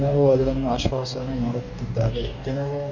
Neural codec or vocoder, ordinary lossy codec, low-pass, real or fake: codec, 44.1 kHz, 2.6 kbps, DAC; none; 7.2 kHz; fake